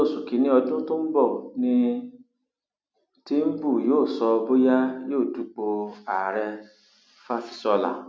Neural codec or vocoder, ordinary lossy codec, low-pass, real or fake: none; none; none; real